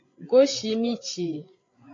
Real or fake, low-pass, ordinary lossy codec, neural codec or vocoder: fake; 7.2 kHz; AAC, 32 kbps; codec, 16 kHz, 16 kbps, FreqCodec, larger model